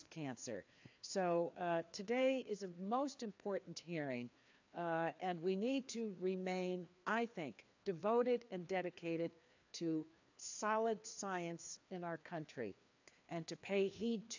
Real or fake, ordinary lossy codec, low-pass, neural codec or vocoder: fake; AAC, 48 kbps; 7.2 kHz; codec, 16 kHz, 2 kbps, FreqCodec, larger model